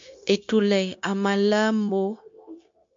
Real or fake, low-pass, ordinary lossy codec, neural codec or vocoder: fake; 7.2 kHz; MP3, 48 kbps; codec, 16 kHz, 0.9 kbps, LongCat-Audio-Codec